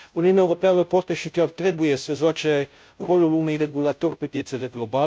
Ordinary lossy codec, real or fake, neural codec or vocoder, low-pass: none; fake; codec, 16 kHz, 0.5 kbps, FunCodec, trained on Chinese and English, 25 frames a second; none